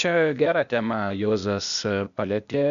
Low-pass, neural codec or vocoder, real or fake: 7.2 kHz; codec, 16 kHz, 0.8 kbps, ZipCodec; fake